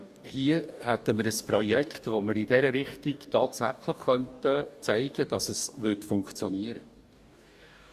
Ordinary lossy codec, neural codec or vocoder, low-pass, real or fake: Opus, 64 kbps; codec, 44.1 kHz, 2.6 kbps, DAC; 14.4 kHz; fake